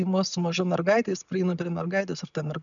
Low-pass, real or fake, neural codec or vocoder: 7.2 kHz; real; none